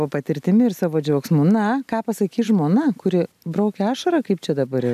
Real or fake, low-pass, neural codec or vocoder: real; 14.4 kHz; none